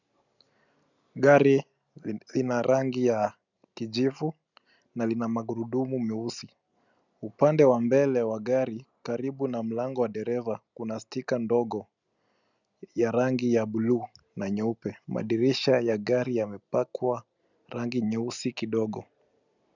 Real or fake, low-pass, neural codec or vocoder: real; 7.2 kHz; none